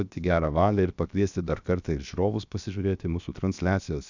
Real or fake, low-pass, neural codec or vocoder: fake; 7.2 kHz; codec, 16 kHz, 0.7 kbps, FocalCodec